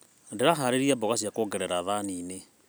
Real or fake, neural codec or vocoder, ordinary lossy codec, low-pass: real; none; none; none